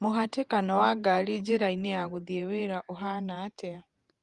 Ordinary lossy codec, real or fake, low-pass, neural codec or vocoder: Opus, 32 kbps; fake; 10.8 kHz; vocoder, 48 kHz, 128 mel bands, Vocos